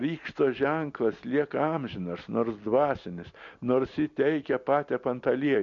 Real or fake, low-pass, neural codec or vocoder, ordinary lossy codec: real; 7.2 kHz; none; MP3, 48 kbps